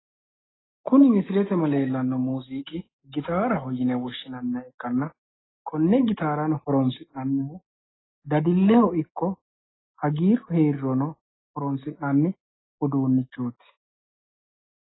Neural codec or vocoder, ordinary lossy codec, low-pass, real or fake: none; AAC, 16 kbps; 7.2 kHz; real